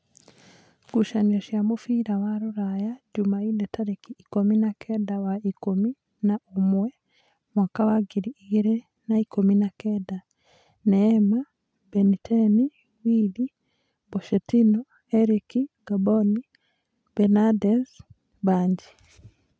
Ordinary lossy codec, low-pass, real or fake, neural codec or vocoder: none; none; real; none